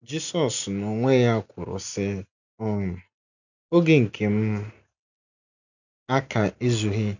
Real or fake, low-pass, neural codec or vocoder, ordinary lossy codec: real; 7.2 kHz; none; none